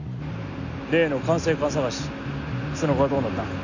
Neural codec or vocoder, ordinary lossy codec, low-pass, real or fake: none; none; 7.2 kHz; real